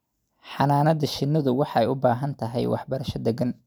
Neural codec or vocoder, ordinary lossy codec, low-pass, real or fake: none; none; none; real